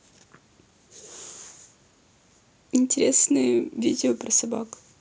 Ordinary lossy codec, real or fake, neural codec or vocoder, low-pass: none; real; none; none